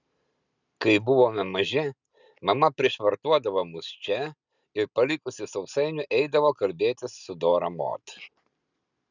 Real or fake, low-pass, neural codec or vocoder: real; 7.2 kHz; none